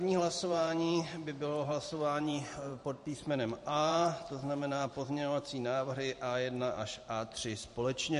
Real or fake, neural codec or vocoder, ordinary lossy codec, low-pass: fake; vocoder, 44.1 kHz, 128 mel bands every 512 samples, BigVGAN v2; MP3, 48 kbps; 14.4 kHz